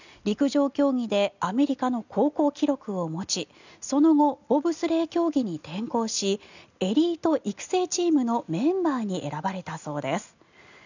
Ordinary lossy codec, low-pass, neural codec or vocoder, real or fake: none; 7.2 kHz; none; real